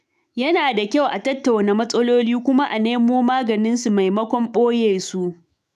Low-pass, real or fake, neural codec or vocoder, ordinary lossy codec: 14.4 kHz; fake; autoencoder, 48 kHz, 128 numbers a frame, DAC-VAE, trained on Japanese speech; none